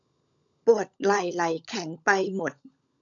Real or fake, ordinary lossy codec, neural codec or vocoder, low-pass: fake; none; codec, 16 kHz, 16 kbps, FunCodec, trained on LibriTTS, 50 frames a second; 7.2 kHz